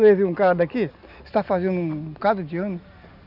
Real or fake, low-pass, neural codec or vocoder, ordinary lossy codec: real; 5.4 kHz; none; none